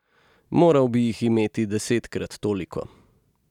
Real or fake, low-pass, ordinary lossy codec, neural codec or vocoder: real; 19.8 kHz; none; none